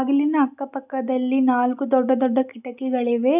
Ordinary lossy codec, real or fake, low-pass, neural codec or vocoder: none; real; 3.6 kHz; none